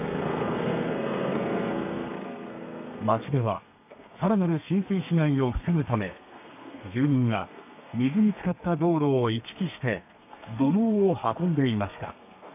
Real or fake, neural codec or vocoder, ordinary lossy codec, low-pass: fake; codec, 32 kHz, 1.9 kbps, SNAC; none; 3.6 kHz